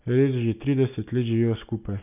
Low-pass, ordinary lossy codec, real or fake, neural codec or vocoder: 3.6 kHz; none; real; none